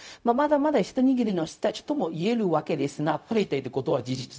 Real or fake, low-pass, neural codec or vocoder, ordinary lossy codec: fake; none; codec, 16 kHz, 0.4 kbps, LongCat-Audio-Codec; none